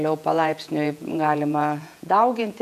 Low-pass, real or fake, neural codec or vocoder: 14.4 kHz; fake; vocoder, 48 kHz, 128 mel bands, Vocos